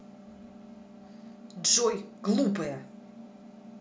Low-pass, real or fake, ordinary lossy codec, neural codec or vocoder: none; real; none; none